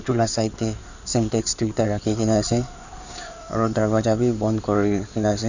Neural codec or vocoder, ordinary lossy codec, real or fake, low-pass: codec, 16 kHz in and 24 kHz out, 2.2 kbps, FireRedTTS-2 codec; none; fake; 7.2 kHz